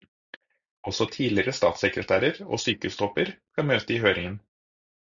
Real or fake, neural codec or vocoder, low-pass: real; none; 7.2 kHz